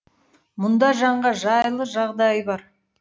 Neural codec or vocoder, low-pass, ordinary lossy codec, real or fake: none; none; none; real